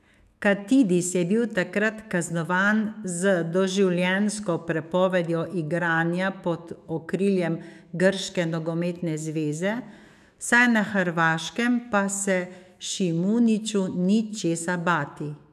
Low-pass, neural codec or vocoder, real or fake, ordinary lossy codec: 14.4 kHz; autoencoder, 48 kHz, 128 numbers a frame, DAC-VAE, trained on Japanese speech; fake; none